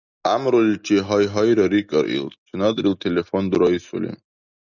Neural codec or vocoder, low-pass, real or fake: none; 7.2 kHz; real